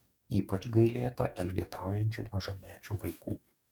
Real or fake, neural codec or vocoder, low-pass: fake; codec, 44.1 kHz, 2.6 kbps, DAC; 19.8 kHz